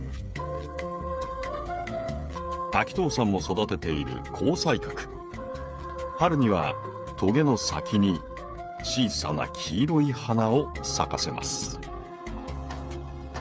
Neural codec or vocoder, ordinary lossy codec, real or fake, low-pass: codec, 16 kHz, 8 kbps, FreqCodec, smaller model; none; fake; none